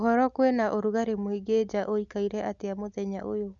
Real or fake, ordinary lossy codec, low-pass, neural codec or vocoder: real; Opus, 64 kbps; 7.2 kHz; none